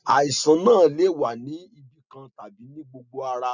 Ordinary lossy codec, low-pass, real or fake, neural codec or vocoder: none; 7.2 kHz; real; none